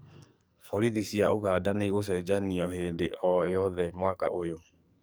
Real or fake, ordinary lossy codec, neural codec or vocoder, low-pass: fake; none; codec, 44.1 kHz, 2.6 kbps, SNAC; none